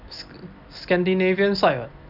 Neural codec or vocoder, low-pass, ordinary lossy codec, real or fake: none; 5.4 kHz; none; real